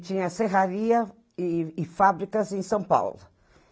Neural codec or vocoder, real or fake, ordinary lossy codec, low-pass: none; real; none; none